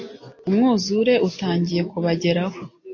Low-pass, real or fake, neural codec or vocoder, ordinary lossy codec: 7.2 kHz; real; none; AAC, 48 kbps